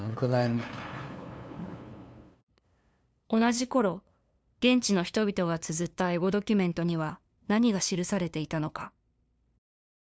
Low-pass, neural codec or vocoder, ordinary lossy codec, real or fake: none; codec, 16 kHz, 2 kbps, FunCodec, trained on LibriTTS, 25 frames a second; none; fake